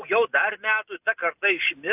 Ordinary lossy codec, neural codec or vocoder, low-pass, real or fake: AAC, 32 kbps; none; 3.6 kHz; real